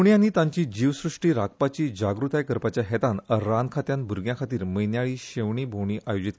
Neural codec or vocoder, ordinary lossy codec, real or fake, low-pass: none; none; real; none